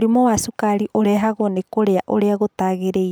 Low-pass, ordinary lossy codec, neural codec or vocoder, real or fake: none; none; none; real